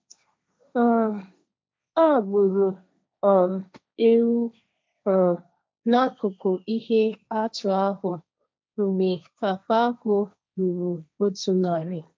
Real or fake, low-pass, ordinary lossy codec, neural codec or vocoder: fake; none; none; codec, 16 kHz, 1.1 kbps, Voila-Tokenizer